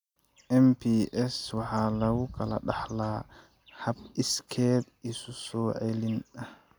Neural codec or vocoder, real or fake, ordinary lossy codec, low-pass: none; real; none; 19.8 kHz